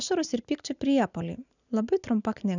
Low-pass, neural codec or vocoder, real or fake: 7.2 kHz; none; real